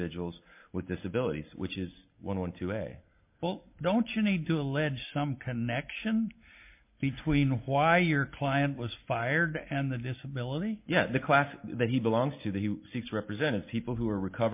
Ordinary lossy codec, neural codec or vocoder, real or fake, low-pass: MP3, 32 kbps; none; real; 3.6 kHz